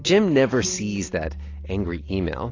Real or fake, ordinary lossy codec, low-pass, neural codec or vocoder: real; AAC, 32 kbps; 7.2 kHz; none